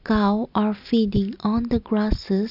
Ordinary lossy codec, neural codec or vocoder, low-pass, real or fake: none; none; 5.4 kHz; real